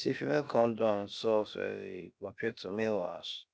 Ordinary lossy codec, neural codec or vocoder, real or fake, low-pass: none; codec, 16 kHz, about 1 kbps, DyCAST, with the encoder's durations; fake; none